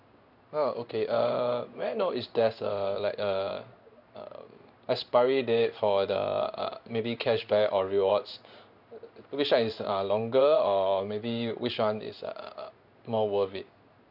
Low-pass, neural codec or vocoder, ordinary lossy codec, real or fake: 5.4 kHz; codec, 16 kHz in and 24 kHz out, 1 kbps, XY-Tokenizer; none; fake